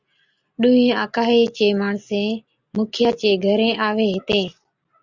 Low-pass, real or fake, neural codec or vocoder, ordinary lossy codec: 7.2 kHz; real; none; Opus, 64 kbps